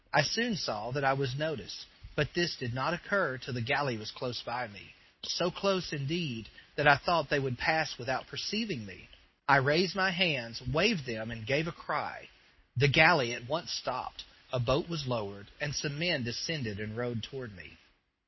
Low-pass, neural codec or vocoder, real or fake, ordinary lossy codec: 7.2 kHz; none; real; MP3, 24 kbps